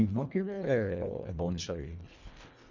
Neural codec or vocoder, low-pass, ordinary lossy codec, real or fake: codec, 24 kHz, 1.5 kbps, HILCodec; 7.2 kHz; none; fake